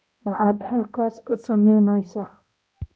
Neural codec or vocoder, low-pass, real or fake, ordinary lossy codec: codec, 16 kHz, 0.5 kbps, X-Codec, HuBERT features, trained on balanced general audio; none; fake; none